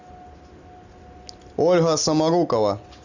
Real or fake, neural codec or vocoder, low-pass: real; none; 7.2 kHz